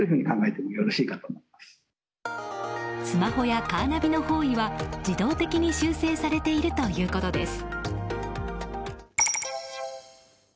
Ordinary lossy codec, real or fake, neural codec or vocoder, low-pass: none; real; none; none